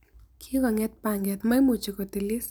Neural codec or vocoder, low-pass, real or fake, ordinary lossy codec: none; none; real; none